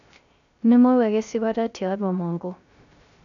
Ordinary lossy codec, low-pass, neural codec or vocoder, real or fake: none; 7.2 kHz; codec, 16 kHz, 0.3 kbps, FocalCodec; fake